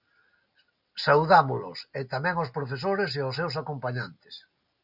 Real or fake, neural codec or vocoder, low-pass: real; none; 5.4 kHz